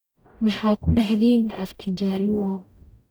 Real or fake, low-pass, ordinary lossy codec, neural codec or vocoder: fake; none; none; codec, 44.1 kHz, 0.9 kbps, DAC